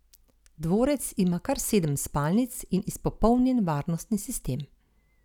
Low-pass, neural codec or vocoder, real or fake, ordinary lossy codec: 19.8 kHz; none; real; none